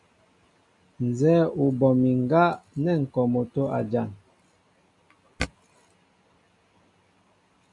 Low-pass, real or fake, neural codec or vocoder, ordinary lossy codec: 10.8 kHz; real; none; MP3, 64 kbps